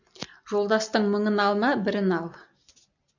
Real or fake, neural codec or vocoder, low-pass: real; none; 7.2 kHz